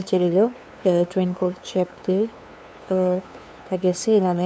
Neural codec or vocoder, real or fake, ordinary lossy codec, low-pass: codec, 16 kHz, 2 kbps, FunCodec, trained on LibriTTS, 25 frames a second; fake; none; none